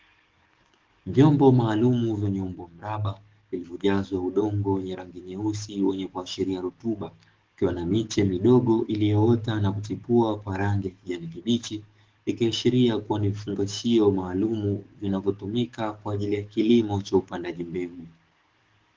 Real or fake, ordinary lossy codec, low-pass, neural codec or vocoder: fake; Opus, 16 kbps; 7.2 kHz; codec, 44.1 kHz, 7.8 kbps, DAC